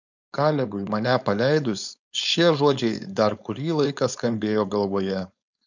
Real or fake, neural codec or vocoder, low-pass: fake; codec, 16 kHz, 4.8 kbps, FACodec; 7.2 kHz